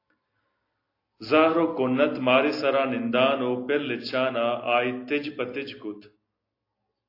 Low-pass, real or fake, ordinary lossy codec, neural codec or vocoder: 5.4 kHz; real; AAC, 32 kbps; none